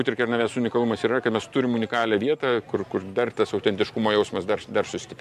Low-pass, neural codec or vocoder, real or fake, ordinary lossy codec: 14.4 kHz; none; real; MP3, 64 kbps